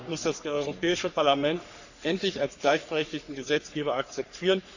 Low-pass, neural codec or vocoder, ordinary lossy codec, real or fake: 7.2 kHz; codec, 44.1 kHz, 3.4 kbps, Pupu-Codec; none; fake